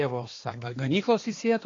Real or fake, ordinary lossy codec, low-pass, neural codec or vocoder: fake; AAC, 32 kbps; 7.2 kHz; codec, 16 kHz, 2 kbps, X-Codec, HuBERT features, trained on balanced general audio